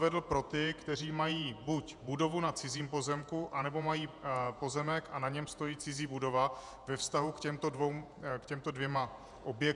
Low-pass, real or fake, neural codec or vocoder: 10.8 kHz; fake; vocoder, 48 kHz, 128 mel bands, Vocos